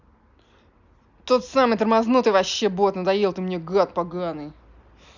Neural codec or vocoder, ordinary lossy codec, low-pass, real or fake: none; none; 7.2 kHz; real